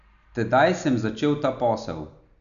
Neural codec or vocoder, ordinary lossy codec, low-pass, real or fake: none; none; 7.2 kHz; real